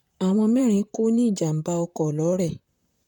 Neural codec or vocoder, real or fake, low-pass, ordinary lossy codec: vocoder, 44.1 kHz, 128 mel bands, Pupu-Vocoder; fake; 19.8 kHz; none